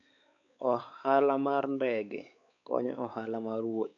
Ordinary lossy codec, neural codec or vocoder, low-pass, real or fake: none; codec, 16 kHz, 4 kbps, X-Codec, WavLM features, trained on Multilingual LibriSpeech; 7.2 kHz; fake